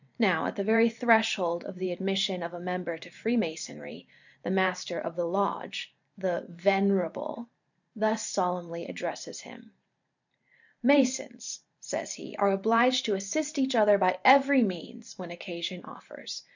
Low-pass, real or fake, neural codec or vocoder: 7.2 kHz; fake; vocoder, 44.1 kHz, 128 mel bands every 512 samples, BigVGAN v2